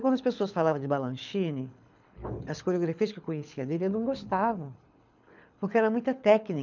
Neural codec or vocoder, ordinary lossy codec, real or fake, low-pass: codec, 24 kHz, 6 kbps, HILCodec; none; fake; 7.2 kHz